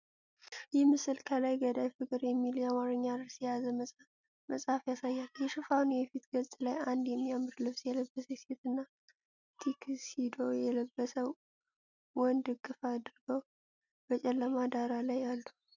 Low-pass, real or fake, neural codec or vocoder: 7.2 kHz; real; none